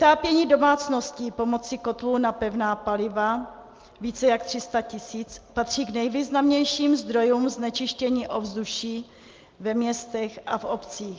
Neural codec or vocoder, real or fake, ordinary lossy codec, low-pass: none; real; Opus, 24 kbps; 7.2 kHz